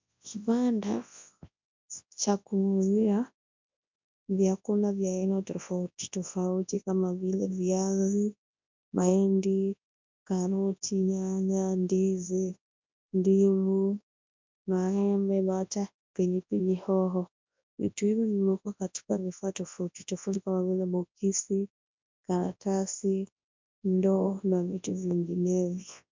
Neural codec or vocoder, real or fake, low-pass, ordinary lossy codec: codec, 24 kHz, 0.9 kbps, WavTokenizer, large speech release; fake; 7.2 kHz; MP3, 64 kbps